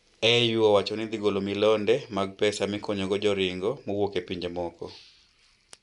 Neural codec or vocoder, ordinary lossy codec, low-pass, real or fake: none; none; 10.8 kHz; real